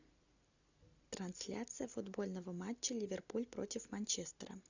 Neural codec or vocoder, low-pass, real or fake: none; 7.2 kHz; real